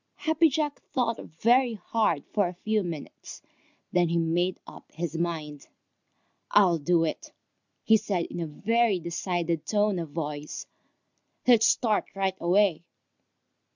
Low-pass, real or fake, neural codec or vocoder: 7.2 kHz; real; none